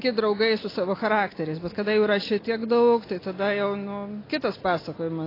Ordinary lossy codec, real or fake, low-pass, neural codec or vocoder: AAC, 24 kbps; real; 5.4 kHz; none